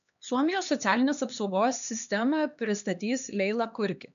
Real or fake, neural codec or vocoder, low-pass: fake; codec, 16 kHz, 2 kbps, X-Codec, HuBERT features, trained on LibriSpeech; 7.2 kHz